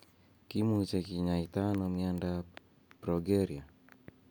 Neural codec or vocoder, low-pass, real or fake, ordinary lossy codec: none; none; real; none